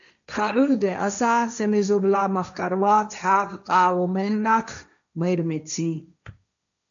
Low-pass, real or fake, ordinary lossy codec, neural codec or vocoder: 7.2 kHz; fake; MP3, 96 kbps; codec, 16 kHz, 1.1 kbps, Voila-Tokenizer